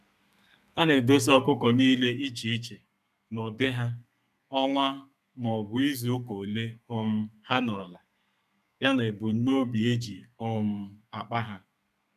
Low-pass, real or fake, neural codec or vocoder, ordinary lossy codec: 14.4 kHz; fake; codec, 32 kHz, 1.9 kbps, SNAC; none